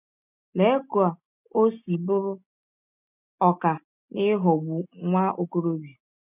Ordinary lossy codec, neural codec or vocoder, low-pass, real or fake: none; none; 3.6 kHz; real